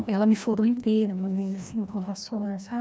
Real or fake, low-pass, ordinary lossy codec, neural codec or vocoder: fake; none; none; codec, 16 kHz, 1 kbps, FreqCodec, larger model